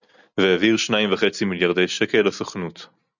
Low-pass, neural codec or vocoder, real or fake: 7.2 kHz; none; real